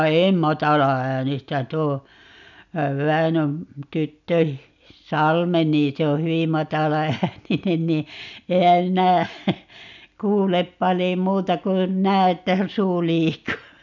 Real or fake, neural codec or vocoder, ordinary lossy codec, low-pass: real; none; none; 7.2 kHz